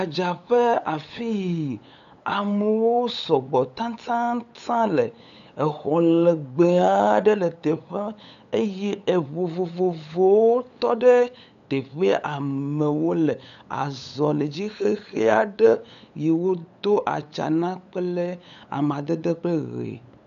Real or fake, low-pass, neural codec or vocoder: fake; 7.2 kHz; codec, 16 kHz, 8 kbps, FunCodec, trained on LibriTTS, 25 frames a second